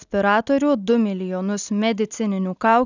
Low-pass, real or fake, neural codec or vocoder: 7.2 kHz; real; none